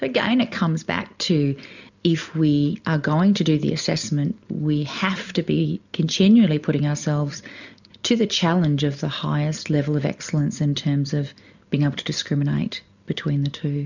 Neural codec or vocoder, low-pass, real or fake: none; 7.2 kHz; real